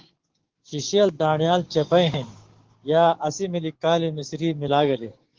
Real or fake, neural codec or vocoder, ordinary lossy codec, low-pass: fake; codec, 16 kHz, 6 kbps, DAC; Opus, 16 kbps; 7.2 kHz